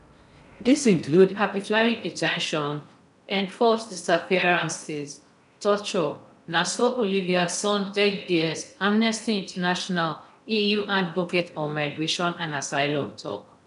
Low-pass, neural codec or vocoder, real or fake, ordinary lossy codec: 10.8 kHz; codec, 16 kHz in and 24 kHz out, 0.6 kbps, FocalCodec, streaming, 4096 codes; fake; none